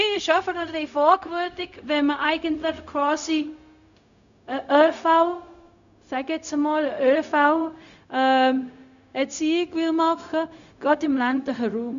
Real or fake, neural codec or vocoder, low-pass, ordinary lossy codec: fake; codec, 16 kHz, 0.4 kbps, LongCat-Audio-Codec; 7.2 kHz; none